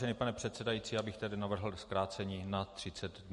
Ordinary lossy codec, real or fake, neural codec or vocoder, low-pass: MP3, 48 kbps; real; none; 14.4 kHz